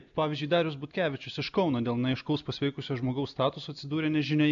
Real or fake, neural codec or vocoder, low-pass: real; none; 7.2 kHz